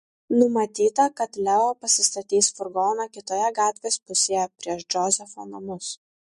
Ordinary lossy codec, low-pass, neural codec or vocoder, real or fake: MP3, 48 kbps; 14.4 kHz; none; real